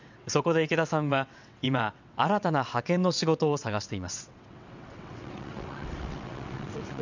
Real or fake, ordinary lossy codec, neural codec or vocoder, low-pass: fake; none; vocoder, 22.05 kHz, 80 mel bands, WaveNeXt; 7.2 kHz